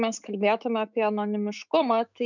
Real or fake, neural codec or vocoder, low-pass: fake; autoencoder, 48 kHz, 128 numbers a frame, DAC-VAE, trained on Japanese speech; 7.2 kHz